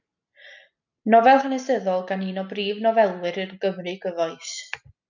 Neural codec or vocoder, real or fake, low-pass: none; real; 7.2 kHz